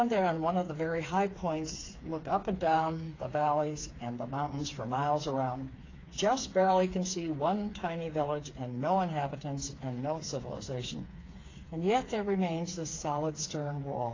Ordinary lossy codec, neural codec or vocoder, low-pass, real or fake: AAC, 32 kbps; codec, 16 kHz, 4 kbps, FreqCodec, smaller model; 7.2 kHz; fake